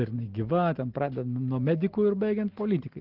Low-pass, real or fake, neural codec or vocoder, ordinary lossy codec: 5.4 kHz; real; none; Opus, 16 kbps